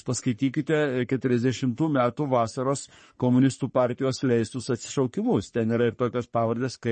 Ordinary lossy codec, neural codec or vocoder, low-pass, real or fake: MP3, 32 kbps; codec, 44.1 kHz, 3.4 kbps, Pupu-Codec; 10.8 kHz; fake